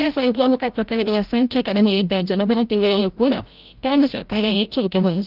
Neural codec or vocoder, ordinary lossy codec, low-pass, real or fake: codec, 16 kHz, 0.5 kbps, FreqCodec, larger model; Opus, 24 kbps; 5.4 kHz; fake